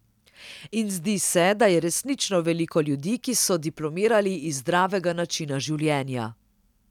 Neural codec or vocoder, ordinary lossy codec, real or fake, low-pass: none; none; real; 19.8 kHz